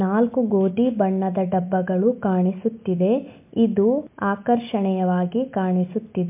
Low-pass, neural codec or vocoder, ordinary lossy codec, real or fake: 3.6 kHz; none; MP3, 32 kbps; real